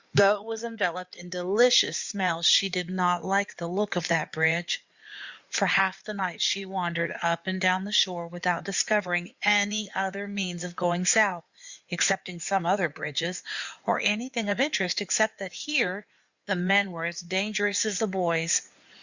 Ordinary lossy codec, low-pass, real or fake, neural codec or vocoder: Opus, 64 kbps; 7.2 kHz; fake; codec, 16 kHz in and 24 kHz out, 2.2 kbps, FireRedTTS-2 codec